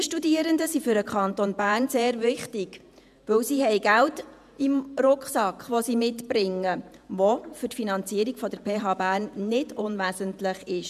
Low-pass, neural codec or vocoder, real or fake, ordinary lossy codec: 14.4 kHz; vocoder, 48 kHz, 128 mel bands, Vocos; fake; none